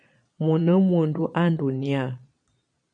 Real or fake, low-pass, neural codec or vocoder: fake; 9.9 kHz; vocoder, 22.05 kHz, 80 mel bands, Vocos